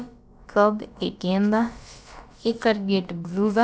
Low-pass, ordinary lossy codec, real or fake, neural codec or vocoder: none; none; fake; codec, 16 kHz, about 1 kbps, DyCAST, with the encoder's durations